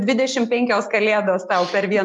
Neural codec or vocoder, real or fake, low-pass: none; real; 10.8 kHz